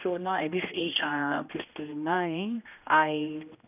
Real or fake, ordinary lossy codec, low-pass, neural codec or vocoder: fake; none; 3.6 kHz; codec, 16 kHz, 1 kbps, X-Codec, HuBERT features, trained on general audio